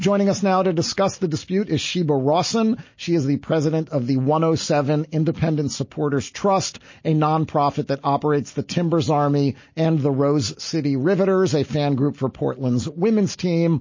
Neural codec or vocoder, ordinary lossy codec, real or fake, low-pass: none; MP3, 32 kbps; real; 7.2 kHz